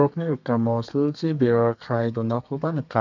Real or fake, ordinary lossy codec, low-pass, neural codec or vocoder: fake; none; 7.2 kHz; codec, 32 kHz, 1.9 kbps, SNAC